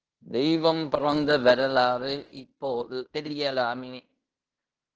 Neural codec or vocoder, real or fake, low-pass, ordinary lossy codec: codec, 16 kHz in and 24 kHz out, 0.9 kbps, LongCat-Audio-Codec, fine tuned four codebook decoder; fake; 7.2 kHz; Opus, 16 kbps